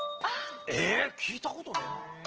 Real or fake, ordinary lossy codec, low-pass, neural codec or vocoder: real; Opus, 16 kbps; 7.2 kHz; none